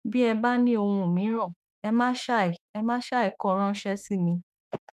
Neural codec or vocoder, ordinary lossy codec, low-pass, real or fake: autoencoder, 48 kHz, 32 numbers a frame, DAC-VAE, trained on Japanese speech; none; 14.4 kHz; fake